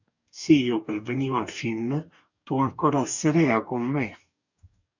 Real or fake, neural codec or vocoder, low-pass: fake; codec, 44.1 kHz, 2.6 kbps, DAC; 7.2 kHz